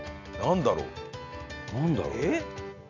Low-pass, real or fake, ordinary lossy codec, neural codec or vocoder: 7.2 kHz; real; none; none